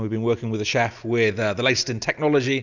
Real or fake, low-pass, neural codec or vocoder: real; 7.2 kHz; none